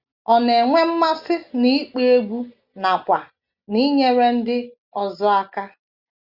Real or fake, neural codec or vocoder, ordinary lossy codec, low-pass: real; none; Opus, 64 kbps; 5.4 kHz